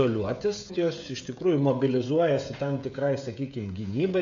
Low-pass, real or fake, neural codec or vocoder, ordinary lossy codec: 7.2 kHz; fake; codec, 16 kHz, 16 kbps, FreqCodec, smaller model; AAC, 64 kbps